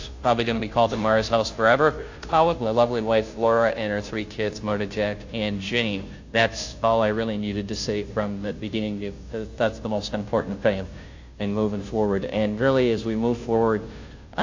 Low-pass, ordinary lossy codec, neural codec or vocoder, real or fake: 7.2 kHz; AAC, 48 kbps; codec, 16 kHz, 0.5 kbps, FunCodec, trained on Chinese and English, 25 frames a second; fake